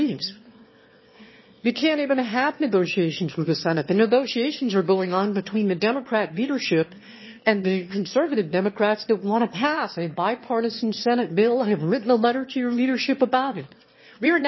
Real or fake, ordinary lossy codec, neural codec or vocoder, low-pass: fake; MP3, 24 kbps; autoencoder, 22.05 kHz, a latent of 192 numbers a frame, VITS, trained on one speaker; 7.2 kHz